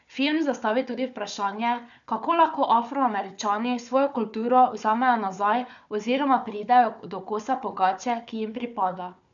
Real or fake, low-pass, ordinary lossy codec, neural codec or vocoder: fake; 7.2 kHz; none; codec, 16 kHz, 4 kbps, FunCodec, trained on Chinese and English, 50 frames a second